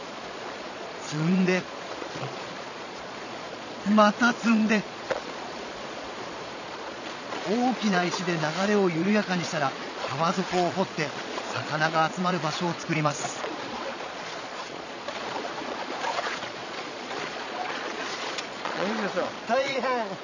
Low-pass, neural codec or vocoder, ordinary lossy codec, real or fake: 7.2 kHz; vocoder, 22.05 kHz, 80 mel bands, Vocos; none; fake